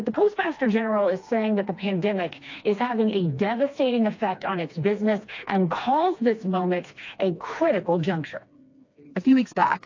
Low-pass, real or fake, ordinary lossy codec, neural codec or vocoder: 7.2 kHz; fake; AAC, 48 kbps; codec, 16 kHz, 2 kbps, FreqCodec, smaller model